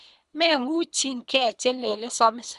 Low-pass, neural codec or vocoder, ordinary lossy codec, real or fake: 10.8 kHz; codec, 24 kHz, 3 kbps, HILCodec; Opus, 64 kbps; fake